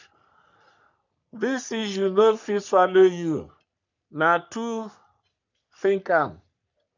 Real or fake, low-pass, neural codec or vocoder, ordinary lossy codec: fake; 7.2 kHz; codec, 44.1 kHz, 3.4 kbps, Pupu-Codec; none